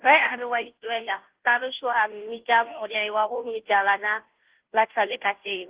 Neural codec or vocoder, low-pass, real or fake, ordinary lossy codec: codec, 16 kHz, 0.5 kbps, FunCodec, trained on Chinese and English, 25 frames a second; 3.6 kHz; fake; Opus, 16 kbps